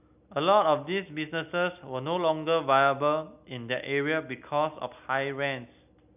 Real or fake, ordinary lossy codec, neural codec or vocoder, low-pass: real; none; none; 3.6 kHz